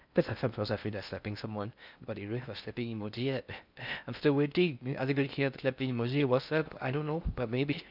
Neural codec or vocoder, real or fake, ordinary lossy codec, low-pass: codec, 16 kHz in and 24 kHz out, 0.6 kbps, FocalCodec, streaming, 4096 codes; fake; none; 5.4 kHz